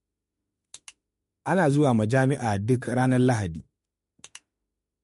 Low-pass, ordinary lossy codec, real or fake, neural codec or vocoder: 14.4 kHz; MP3, 48 kbps; fake; autoencoder, 48 kHz, 32 numbers a frame, DAC-VAE, trained on Japanese speech